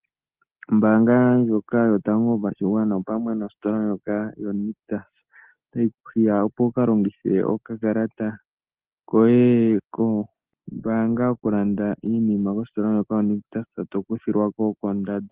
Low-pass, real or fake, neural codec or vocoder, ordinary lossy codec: 3.6 kHz; real; none; Opus, 16 kbps